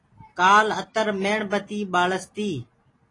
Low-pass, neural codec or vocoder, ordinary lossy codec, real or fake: 10.8 kHz; none; AAC, 32 kbps; real